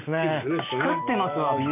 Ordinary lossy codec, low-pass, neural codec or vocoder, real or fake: none; 3.6 kHz; none; real